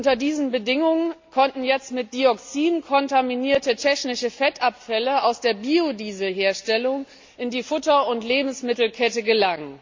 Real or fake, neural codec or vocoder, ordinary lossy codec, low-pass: real; none; MP3, 48 kbps; 7.2 kHz